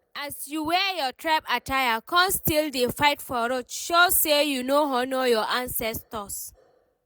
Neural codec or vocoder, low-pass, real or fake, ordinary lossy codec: none; none; real; none